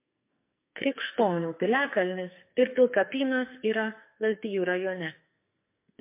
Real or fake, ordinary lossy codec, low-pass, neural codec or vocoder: fake; MP3, 24 kbps; 3.6 kHz; codec, 44.1 kHz, 2.6 kbps, SNAC